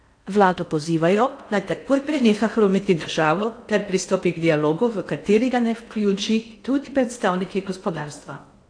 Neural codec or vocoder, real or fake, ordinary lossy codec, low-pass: codec, 16 kHz in and 24 kHz out, 0.6 kbps, FocalCodec, streaming, 4096 codes; fake; AAC, 48 kbps; 9.9 kHz